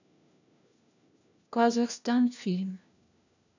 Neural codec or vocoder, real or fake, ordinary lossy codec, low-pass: codec, 16 kHz, 1 kbps, FunCodec, trained on LibriTTS, 50 frames a second; fake; none; 7.2 kHz